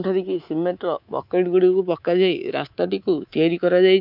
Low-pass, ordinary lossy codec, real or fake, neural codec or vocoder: 5.4 kHz; none; fake; codec, 24 kHz, 3.1 kbps, DualCodec